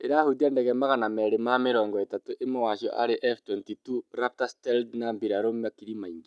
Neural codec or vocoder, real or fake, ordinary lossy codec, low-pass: none; real; none; none